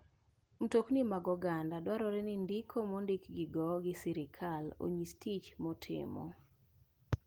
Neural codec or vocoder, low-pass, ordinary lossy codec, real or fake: none; 19.8 kHz; Opus, 24 kbps; real